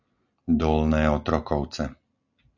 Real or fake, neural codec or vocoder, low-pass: real; none; 7.2 kHz